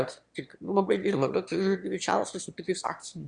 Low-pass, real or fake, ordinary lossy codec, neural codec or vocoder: 9.9 kHz; fake; Opus, 64 kbps; autoencoder, 22.05 kHz, a latent of 192 numbers a frame, VITS, trained on one speaker